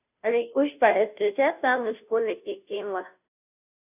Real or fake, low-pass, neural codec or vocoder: fake; 3.6 kHz; codec, 16 kHz, 0.5 kbps, FunCodec, trained on Chinese and English, 25 frames a second